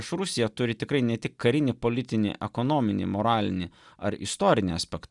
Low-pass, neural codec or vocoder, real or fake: 10.8 kHz; none; real